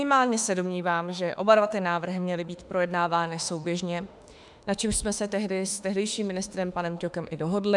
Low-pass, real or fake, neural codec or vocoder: 10.8 kHz; fake; autoencoder, 48 kHz, 32 numbers a frame, DAC-VAE, trained on Japanese speech